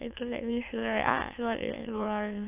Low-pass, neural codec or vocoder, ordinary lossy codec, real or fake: 3.6 kHz; autoencoder, 22.05 kHz, a latent of 192 numbers a frame, VITS, trained on many speakers; AAC, 24 kbps; fake